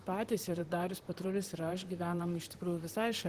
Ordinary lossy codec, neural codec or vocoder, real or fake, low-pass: Opus, 16 kbps; vocoder, 44.1 kHz, 128 mel bands, Pupu-Vocoder; fake; 14.4 kHz